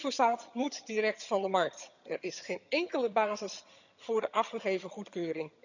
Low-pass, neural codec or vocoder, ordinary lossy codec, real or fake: 7.2 kHz; vocoder, 22.05 kHz, 80 mel bands, HiFi-GAN; none; fake